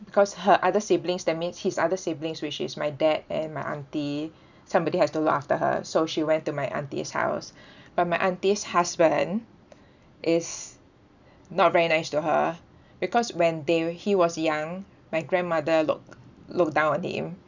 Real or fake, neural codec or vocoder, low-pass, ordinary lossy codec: real; none; 7.2 kHz; none